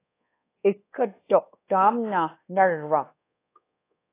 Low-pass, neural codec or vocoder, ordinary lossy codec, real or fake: 3.6 kHz; codec, 24 kHz, 1.2 kbps, DualCodec; AAC, 24 kbps; fake